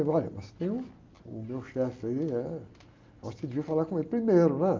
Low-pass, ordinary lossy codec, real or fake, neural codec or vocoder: 7.2 kHz; Opus, 24 kbps; real; none